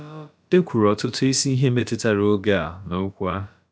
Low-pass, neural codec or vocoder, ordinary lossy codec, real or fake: none; codec, 16 kHz, about 1 kbps, DyCAST, with the encoder's durations; none; fake